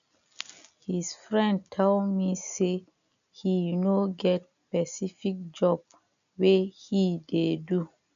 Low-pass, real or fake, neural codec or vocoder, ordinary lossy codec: 7.2 kHz; real; none; none